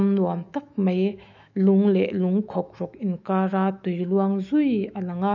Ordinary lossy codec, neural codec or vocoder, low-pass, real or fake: none; autoencoder, 48 kHz, 128 numbers a frame, DAC-VAE, trained on Japanese speech; 7.2 kHz; fake